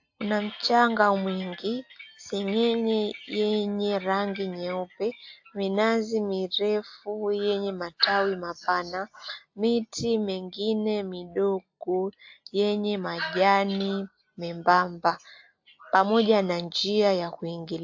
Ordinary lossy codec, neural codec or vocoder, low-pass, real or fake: AAC, 48 kbps; none; 7.2 kHz; real